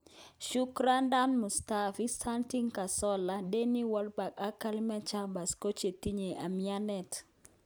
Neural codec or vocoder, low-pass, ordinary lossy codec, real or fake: none; none; none; real